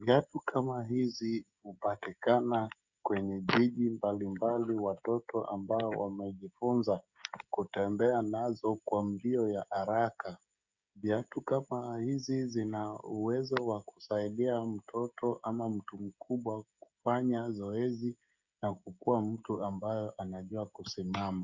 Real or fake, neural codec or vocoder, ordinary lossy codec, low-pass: fake; codec, 16 kHz, 16 kbps, FreqCodec, smaller model; Opus, 64 kbps; 7.2 kHz